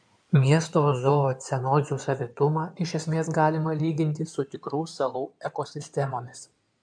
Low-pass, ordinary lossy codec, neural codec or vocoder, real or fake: 9.9 kHz; MP3, 96 kbps; codec, 16 kHz in and 24 kHz out, 2.2 kbps, FireRedTTS-2 codec; fake